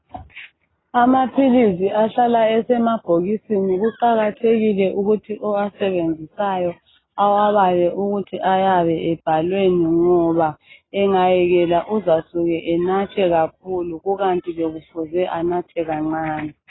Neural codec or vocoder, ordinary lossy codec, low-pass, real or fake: none; AAC, 16 kbps; 7.2 kHz; real